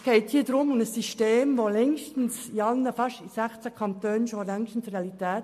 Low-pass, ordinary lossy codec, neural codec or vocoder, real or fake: 14.4 kHz; MP3, 64 kbps; none; real